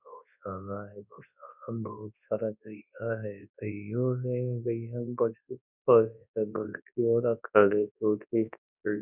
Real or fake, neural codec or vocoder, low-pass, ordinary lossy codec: fake; codec, 24 kHz, 0.9 kbps, WavTokenizer, large speech release; 3.6 kHz; none